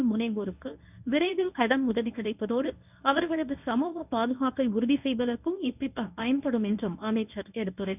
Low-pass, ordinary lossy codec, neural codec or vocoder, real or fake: 3.6 kHz; none; codec, 24 kHz, 0.9 kbps, WavTokenizer, medium speech release version 1; fake